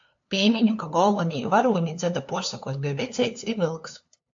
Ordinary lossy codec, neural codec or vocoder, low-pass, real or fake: AAC, 64 kbps; codec, 16 kHz, 2 kbps, FunCodec, trained on LibriTTS, 25 frames a second; 7.2 kHz; fake